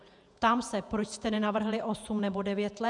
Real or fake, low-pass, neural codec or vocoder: fake; 10.8 kHz; vocoder, 48 kHz, 128 mel bands, Vocos